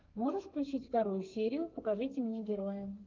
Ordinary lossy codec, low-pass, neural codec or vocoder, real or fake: Opus, 32 kbps; 7.2 kHz; codec, 32 kHz, 1.9 kbps, SNAC; fake